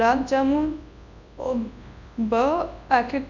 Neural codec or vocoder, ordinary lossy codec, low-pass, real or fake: codec, 24 kHz, 0.9 kbps, WavTokenizer, large speech release; none; 7.2 kHz; fake